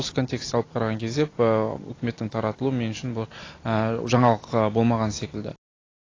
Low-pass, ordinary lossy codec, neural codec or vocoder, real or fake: 7.2 kHz; AAC, 32 kbps; none; real